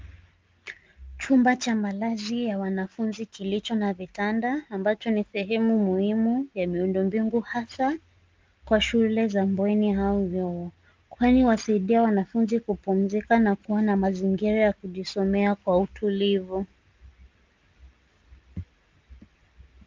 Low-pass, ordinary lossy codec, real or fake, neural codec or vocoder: 7.2 kHz; Opus, 24 kbps; real; none